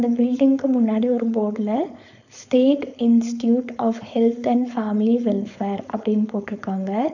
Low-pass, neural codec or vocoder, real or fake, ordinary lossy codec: 7.2 kHz; codec, 16 kHz, 4.8 kbps, FACodec; fake; none